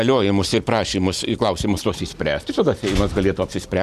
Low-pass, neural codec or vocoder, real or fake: 14.4 kHz; none; real